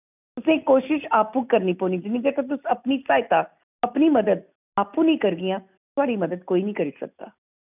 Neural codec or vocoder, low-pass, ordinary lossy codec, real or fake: none; 3.6 kHz; none; real